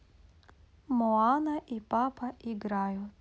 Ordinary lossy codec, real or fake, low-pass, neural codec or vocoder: none; real; none; none